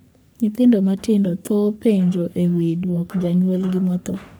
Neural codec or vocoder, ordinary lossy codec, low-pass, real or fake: codec, 44.1 kHz, 3.4 kbps, Pupu-Codec; none; none; fake